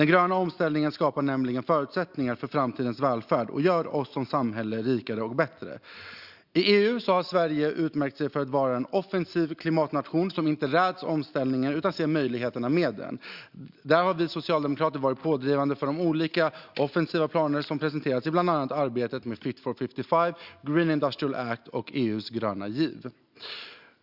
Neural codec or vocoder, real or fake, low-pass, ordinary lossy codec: none; real; 5.4 kHz; Opus, 64 kbps